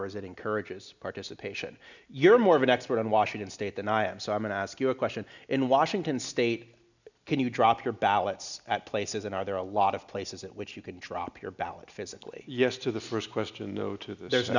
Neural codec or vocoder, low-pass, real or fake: none; 7.2 kHz; real